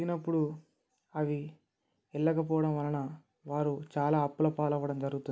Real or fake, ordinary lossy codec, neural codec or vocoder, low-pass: real; none; none; none